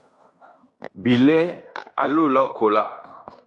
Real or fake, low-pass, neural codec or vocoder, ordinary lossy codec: fake; 10.8 kHz; codec, 16 kHz in and 24 kHz out, 0.9 kbps, LongCat-Audio-Codec, fine tuned four codebook decoder; AAC, 64 kbps